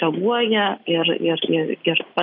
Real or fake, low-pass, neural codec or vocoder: real; 5.4 kHz; none